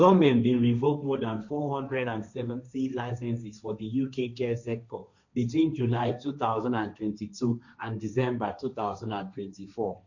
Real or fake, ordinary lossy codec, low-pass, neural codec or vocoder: fake; none; 7.2 kHz; codec, 16 kHz, 1.1 kbps, Voila-Tokenizer